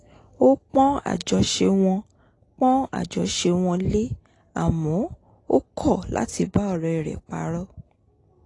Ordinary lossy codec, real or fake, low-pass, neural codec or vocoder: AAC, 32 kbps; real; 10.8 kHz; none